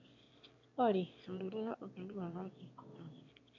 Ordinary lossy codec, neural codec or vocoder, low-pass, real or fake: MP3, 48 kbps; autoencoder, 22.05 kHz, a latent of 192 numbers a frame, VITS, trained on one speaker; 7.2 kHz; fake